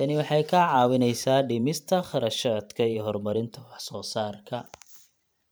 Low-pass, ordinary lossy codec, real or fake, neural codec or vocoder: none; none; fake; vocoder, 44.1 kHz, 128 mel bands every 512 samples, BigVGAN v2